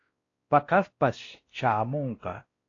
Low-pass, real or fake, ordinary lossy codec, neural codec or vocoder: 7.2 kHz; fake; AAC, 32 kbps; codec, 16 kHz, 1 kbps, X-Codec, WavLM features, trained on Multilingual LibriSpeech